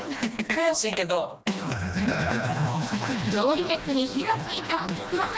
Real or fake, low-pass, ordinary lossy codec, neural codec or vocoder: fake; none; none; codec, 16 kHz, 1 kbps, FreqCodec, smaller model